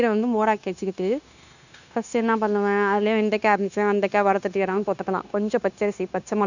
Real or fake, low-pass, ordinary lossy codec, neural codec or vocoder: fake; 7.2 kHz; none; codec, 16 kHz, 0.9 kbps, LongCat-Audio-Codec